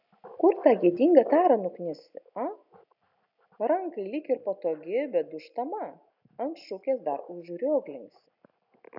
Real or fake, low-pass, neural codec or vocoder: real; 5.4 kHz; none